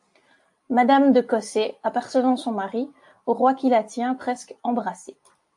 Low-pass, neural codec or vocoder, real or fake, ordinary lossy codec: 10.8 kHz; none; real; AAC, 64 kbps